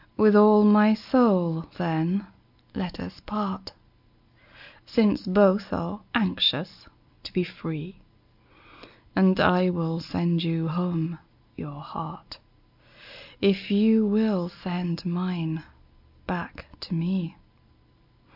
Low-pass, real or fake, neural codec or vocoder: 5.4 kHz; real; none